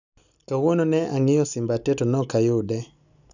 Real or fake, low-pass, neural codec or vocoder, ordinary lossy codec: real; 7.2 kHz; none; none